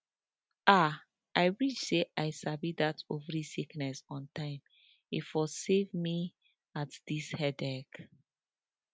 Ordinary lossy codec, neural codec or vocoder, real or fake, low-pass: none; none; real; none